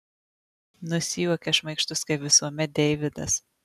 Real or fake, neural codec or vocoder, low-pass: real; none; 14.4 kHz